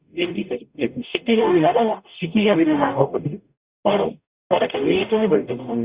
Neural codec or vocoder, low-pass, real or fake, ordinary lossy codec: codec, 44.1 kHz, 0.9 kbps, DAC; 3.6 kHz; fake; Opus, 64 kbps